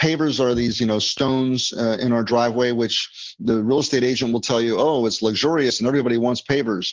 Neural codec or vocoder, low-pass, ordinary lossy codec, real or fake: none; 7.2 kHz; Opus, 16 kbps; real